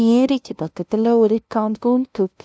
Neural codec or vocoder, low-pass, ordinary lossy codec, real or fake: codec, 16 kHz, 0.5 kbps, FunCodec, trained on LibriTTS, 25 frames a second; none; none; fake